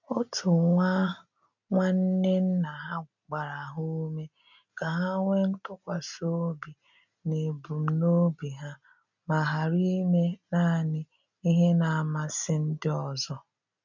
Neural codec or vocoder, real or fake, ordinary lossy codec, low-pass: none; real; none; 7.2 kHz